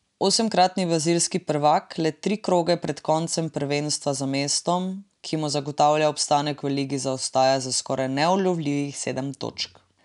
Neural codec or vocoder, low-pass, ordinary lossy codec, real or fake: none; 10.8 kHz; none; real